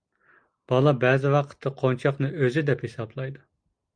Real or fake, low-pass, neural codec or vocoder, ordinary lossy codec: real; 9.9 kHz; none; Opus, 32 kbps